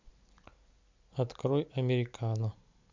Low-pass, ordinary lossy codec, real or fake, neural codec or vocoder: 7.2 kHz; MP3, 64 kbps; real; none